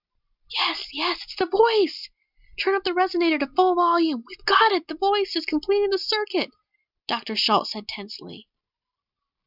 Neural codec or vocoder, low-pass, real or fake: none; 5.4 kHz; real